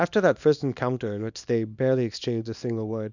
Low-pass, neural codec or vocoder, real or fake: 7.2 kHz; codec, 24 kHz, 0.9 kbps, WavTokenizer, small release; fake